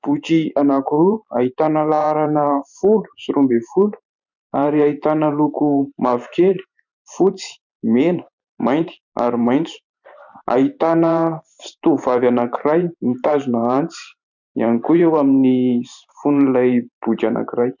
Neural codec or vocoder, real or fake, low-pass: vocoder, 44.1 kHz, 128 mel bands every 512 samples, BigVGAN v2; fake; 7.2 kHz